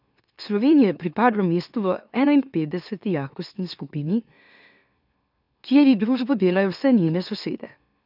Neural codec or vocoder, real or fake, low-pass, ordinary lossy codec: autoencoder, 44.1 kHz, a latent of 192 numbers a frame, MeloTTS; fake; 5.4 kHz; none